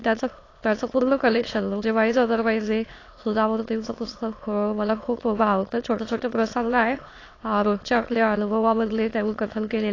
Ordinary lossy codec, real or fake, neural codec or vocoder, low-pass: AAC, 32 kbps; fake; autoencoder, 22.05 kHz, a latent of 192 numbers a frame, VITS, trained on many speakers; 7.2 kHz